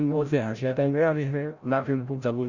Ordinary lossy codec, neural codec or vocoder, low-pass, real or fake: Opus, 64 kbps; codec, 16 kHz, 0.5 kbps, FreqCodec, larger model; 7.2 kHz; fake